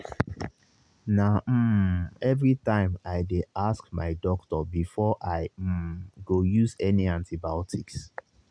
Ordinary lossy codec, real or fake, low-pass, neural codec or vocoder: none; real; 9.9 kHz; none